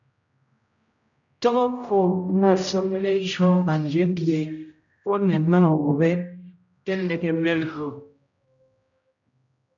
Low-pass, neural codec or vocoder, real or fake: 7.2 kHz; codec, 16 kHz, 0.5 kbps, X-Codec, HuBERT features, trained on general audio; fake